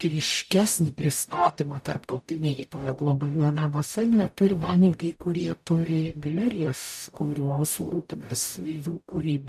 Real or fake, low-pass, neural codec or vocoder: fake; 14.4 kHz; codec, 44.1 kHz, 0.9 kbps, DAC